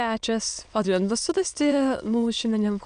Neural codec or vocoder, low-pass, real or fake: autoencoder, 22.05 kHz, a latent of 192 numbers a frame, VITS, trained on many speakers; 9.9 kHz; fake